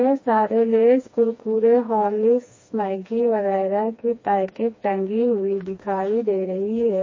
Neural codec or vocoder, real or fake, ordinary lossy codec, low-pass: codec, 16 kHz, 2 kbps, FreqCodec, smaller model; fake; MP3, 32 kbps; 7.2 kHz